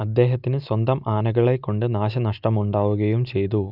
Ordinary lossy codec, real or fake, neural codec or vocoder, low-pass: none; real; none; 5.4 kHz